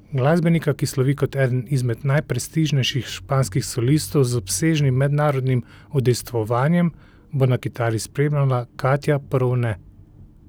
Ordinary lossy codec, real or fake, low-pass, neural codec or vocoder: none; real; none; none